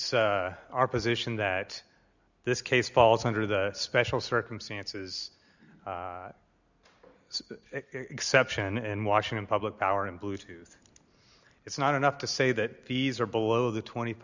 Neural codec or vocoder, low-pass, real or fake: none; 7.2 kHz; real